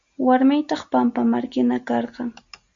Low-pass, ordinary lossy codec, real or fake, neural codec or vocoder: 7.2 kHz; Opus, 64 kbps; real; none